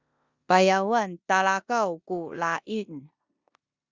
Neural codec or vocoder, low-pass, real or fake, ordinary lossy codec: codec, 16 kHz in and 24 kHz out, 0.9 kbps, LongCat-Audio-Codec, four codebook decoder; 7.2 kHz; fake; Opus, 64 kbps